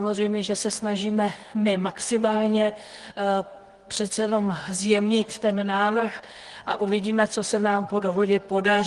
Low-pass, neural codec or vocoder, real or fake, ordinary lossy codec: 10.8 kHz; codec, 24 kHz, 0.9 kbps, WavTokenizer, medium music audio release; fake; Opus, 24 kbps